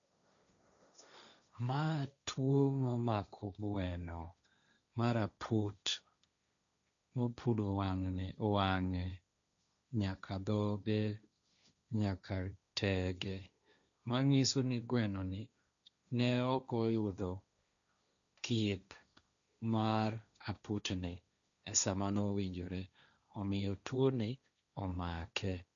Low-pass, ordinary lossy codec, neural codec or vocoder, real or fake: 7.2 kHz; none; codec, 16 kHz, 1.1 kbps, Voila-Tokenizer; fake